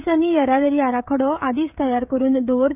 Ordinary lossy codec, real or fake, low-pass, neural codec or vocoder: none; fake; 3.6 kHz; codec, 16 kHz, 16 kbps, FreqCodec, smaller model